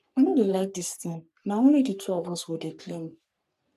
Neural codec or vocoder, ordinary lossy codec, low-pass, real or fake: codec, 44.1 kHz, 3.4 kbps, Pupu-Codec; none; 14.4 kHz; fake